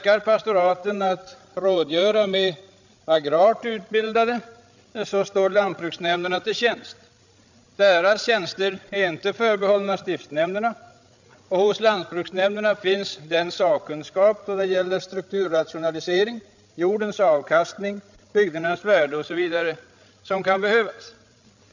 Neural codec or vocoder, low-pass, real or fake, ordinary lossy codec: codec, 16 kHz, 16 kbps, FreqCodec, larger model; 7.2 kHz; fake; none